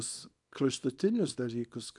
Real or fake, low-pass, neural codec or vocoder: fake; 10.8 kHz; codec, 24 kHz, 0.9 kbps, WavTokenizer, small release